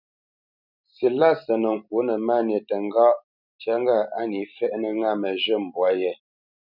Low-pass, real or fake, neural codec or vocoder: 5.4 kHz; fake; vocoder, 44.1 kHz, 128 mel bands every 256 samples, BigVGAN v2